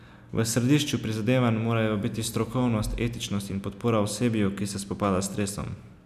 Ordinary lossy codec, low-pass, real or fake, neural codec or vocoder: none; 14.4 kHz; real; none